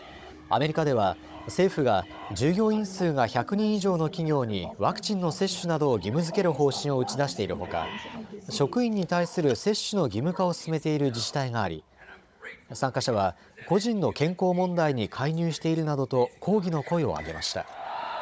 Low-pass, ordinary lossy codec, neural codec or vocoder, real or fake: none; none; codec, 16 kHz, 16 kbps, FunCodec, trained on Chinese and English, 50 frames a second; fake